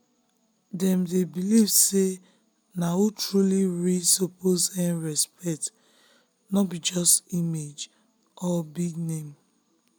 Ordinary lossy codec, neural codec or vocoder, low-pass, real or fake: none; none; none; real